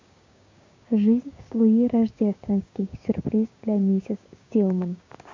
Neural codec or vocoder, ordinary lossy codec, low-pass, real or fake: codec, 16 kHz, 6 kbps, DAC; MP3, 48 kbps; 7.2 kHz; fake